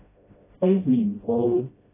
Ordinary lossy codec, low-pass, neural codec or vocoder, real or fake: AAC, 16 kbps; 3.6 kHz; codec, 16 kHz, 0.5 kbps, FreqCodec, smaller model; fake